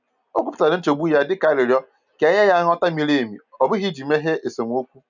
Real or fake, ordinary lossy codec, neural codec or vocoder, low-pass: real; none; none; 7.2 kHz